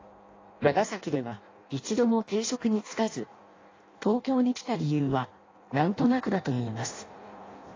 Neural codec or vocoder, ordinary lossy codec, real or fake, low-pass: codec, 16 kHz in and 24 kHz out, 0.6 kbps, FireRedTTS-2 codec; AAC, 32 kbps; fake; 7.2 kHz